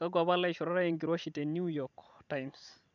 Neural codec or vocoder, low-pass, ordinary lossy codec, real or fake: vocoder, 44.1 kHz, 128 mel bands every 512 samples, BigVGAN v2; 7.2 kHz; none; fake